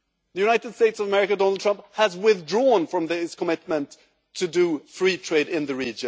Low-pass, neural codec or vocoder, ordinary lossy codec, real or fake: none; none; none; real